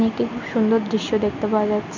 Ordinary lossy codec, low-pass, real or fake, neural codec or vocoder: MP3, 64 kbps; 7.2 kHz; real; none